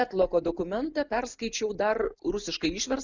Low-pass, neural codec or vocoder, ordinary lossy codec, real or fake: 7.2 kHz; none; Opus, 64 kbps; real